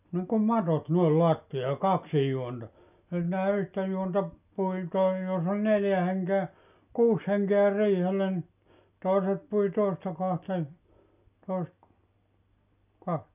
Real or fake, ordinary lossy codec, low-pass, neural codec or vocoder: real; none; 3.6 kHz; none